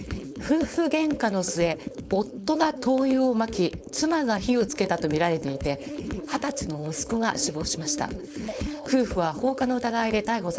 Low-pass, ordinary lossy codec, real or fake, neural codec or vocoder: none; none; fake; codec, 16 kHz, 4.8 kbps, FACodec